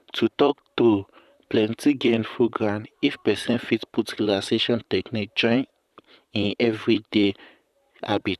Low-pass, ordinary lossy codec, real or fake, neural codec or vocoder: 14.4 kHz; none; fake; vocoder, 44.1 kHz, 128 mel bands, Pupu-Vocoder